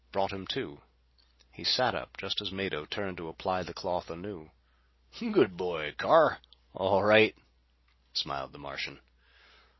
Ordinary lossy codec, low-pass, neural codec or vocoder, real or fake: MP3, 24 kbps; 7.2 kHz; none; real